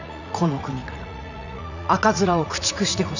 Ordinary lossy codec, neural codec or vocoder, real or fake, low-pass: none; vocoder, 44.1 kHz, 80 mel bands, Vocos; fake; 7.2 kHz